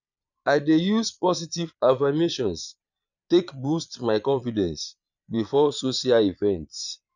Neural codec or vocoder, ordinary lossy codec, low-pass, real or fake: vocoder, 22.05 kHz, 80 mel bands, Vocos; none; 7.2 kHz; fake